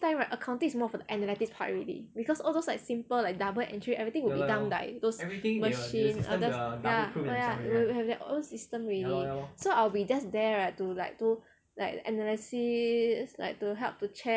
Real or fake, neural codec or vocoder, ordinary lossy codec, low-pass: real; none; none; none